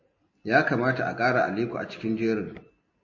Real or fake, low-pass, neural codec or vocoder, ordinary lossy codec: real; 7.2 kHz; none; MP3, 32 kbps